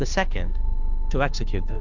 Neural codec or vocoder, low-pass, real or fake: codec, 16 kHz, 2 kbps, FunCodec, trained on Chinese and English, 25 frames a second; 7.2 kHz; fake